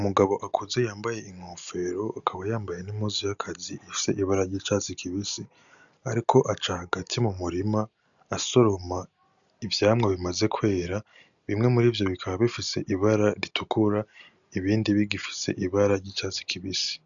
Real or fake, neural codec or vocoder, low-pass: real; none; 7.2 kHz